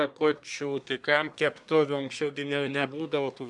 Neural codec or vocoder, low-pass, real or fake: codec, 24 kHz, 1 kbps, SNAC; 10.8 kHz; fake